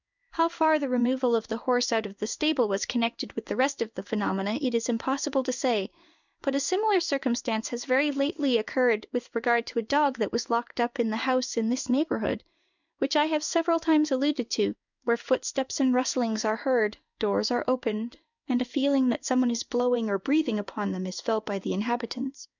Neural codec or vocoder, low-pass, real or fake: codec, 16 kHz in and 24 kHz out, 1 kbps, XY-Tokenizer; 7.2 kHz; fake